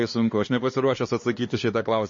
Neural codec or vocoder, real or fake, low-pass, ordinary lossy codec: codec, 16 kHz, 2 kbps, X-Codec, HuBERT features, trained on LibriSpeech; fake; 7.2 kHz; MP3, 32 kbps